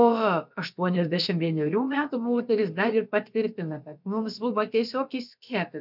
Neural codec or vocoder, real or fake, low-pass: codec, 16 kHz, about 1 kbps, DyCAST, with the encoder's durations; fake; 5.4 kHz